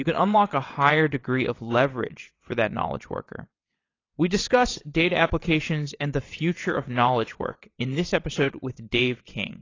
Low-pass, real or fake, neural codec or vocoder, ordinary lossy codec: 7.2 kHz; fake; vocoder, 22.05 kHz, 80 mel bands, WaveNeXt; AAC, 32 kbps